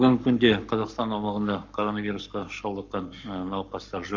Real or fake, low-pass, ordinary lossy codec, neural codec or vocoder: fake; 7.2 kHz; MP3, 64 kbps; codec, 44.1 kHz, 7.8 kbps, Pupu-Codec